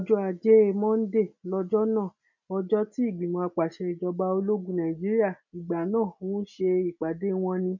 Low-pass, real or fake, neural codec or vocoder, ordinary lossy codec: 7.2 kHz; real; none; none